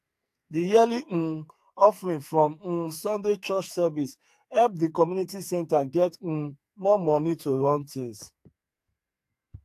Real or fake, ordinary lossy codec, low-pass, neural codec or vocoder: fake; AAC, 64 kbps; 14.4 kHz; codec, 44.1 kHz, 2.6 kbps, SNAC